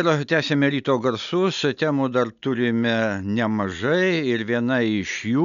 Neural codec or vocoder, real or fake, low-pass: none; real; 7.2 kHz